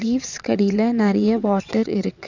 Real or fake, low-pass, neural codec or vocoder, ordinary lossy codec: fake; 7.2 kHz; vocoder, 22.05 kHz, 80 mel bands, WaveNeXt; none